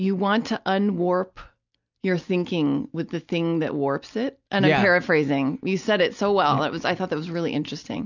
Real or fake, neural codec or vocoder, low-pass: real; none; 7.2 kHz